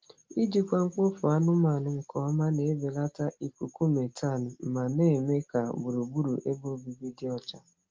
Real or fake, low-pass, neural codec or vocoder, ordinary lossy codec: real; 7.2 kHz; none; Opus, 32 kbps